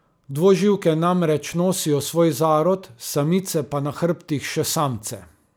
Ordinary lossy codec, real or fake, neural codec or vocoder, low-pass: none; real; none; none